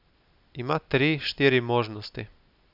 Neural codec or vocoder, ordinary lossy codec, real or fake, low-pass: none; none; real; 5.4 kHz